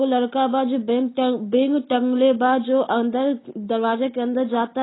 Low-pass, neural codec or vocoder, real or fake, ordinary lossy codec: 7.2 kHz; none; real; AAC, 16 kbps